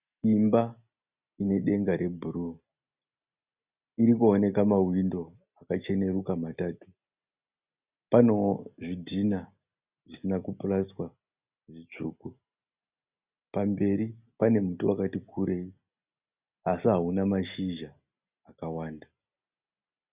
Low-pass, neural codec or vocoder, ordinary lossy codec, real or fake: 3.6 kHz; none; Opus, 64 kbps; real